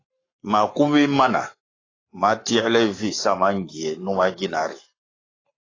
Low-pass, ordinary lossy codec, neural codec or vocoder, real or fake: 7.2 kHz; AAC, 32 kbps; codec, 44.1 kHz, 7.8 kbps, Pupu-Codec; fake